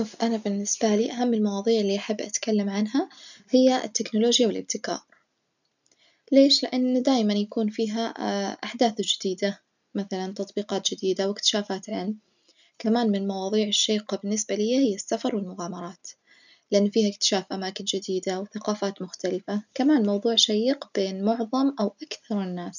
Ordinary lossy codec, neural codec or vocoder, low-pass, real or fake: none; none; 7.2 kHz; real